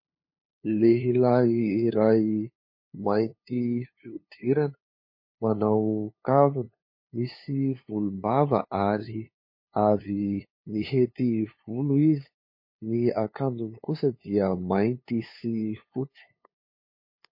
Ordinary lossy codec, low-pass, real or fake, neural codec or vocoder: MP3, 24 kbps; 5.4 kHz; fake; codec, 16 kHz, 2 kbps, FunCodec, trained on LibriTTS, 25 frames a second